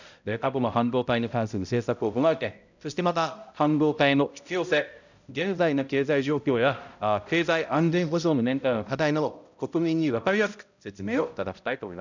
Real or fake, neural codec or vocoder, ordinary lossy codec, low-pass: fake; codec, 16 kHz, 0.5 kbps, X-Codec, HuBERT features, trained on balanced general audio; none; 7.2 kHz